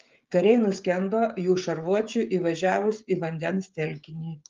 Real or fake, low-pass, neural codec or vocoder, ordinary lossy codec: fake; 7.2 kHz; codec, 16 kHz, 16 kbps, FreqCodec, smaller model; Opus, 32 kbps